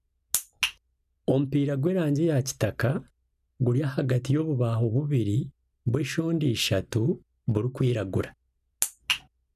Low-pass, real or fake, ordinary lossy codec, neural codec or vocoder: 14.4 kHz; real; none; none